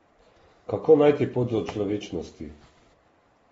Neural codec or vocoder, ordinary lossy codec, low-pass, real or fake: vocoder, 44.1 kHz, 128 mel bands every 512 samples, BigVGAN v2; AAC, 24 kbps; 19.8 kHz; fake